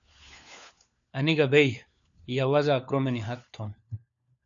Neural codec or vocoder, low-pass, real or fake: codec, 16 kHz, 4 kbps, FunCodec, trained on LibriTTS, 50 frames a second; 7.2 kHz; fake